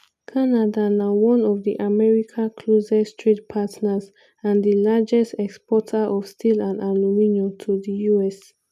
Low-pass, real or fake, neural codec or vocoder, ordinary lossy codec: 14.4 kHz; real; none; none